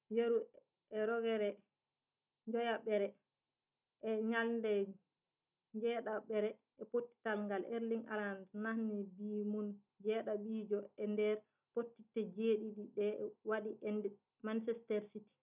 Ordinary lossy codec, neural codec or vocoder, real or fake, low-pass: none; none; real; 3.6 kHz